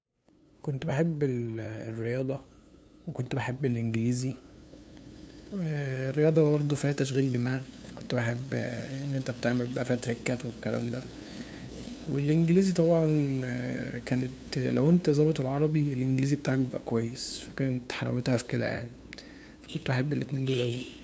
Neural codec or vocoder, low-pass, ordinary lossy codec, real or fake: codec, 16 kHz, 2 kbps, FunCodec, trained on LibriTTS, 25 frames a second; none; none; fake